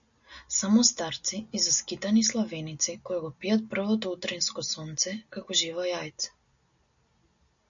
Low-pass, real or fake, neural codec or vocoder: 7.2 kHz; real; none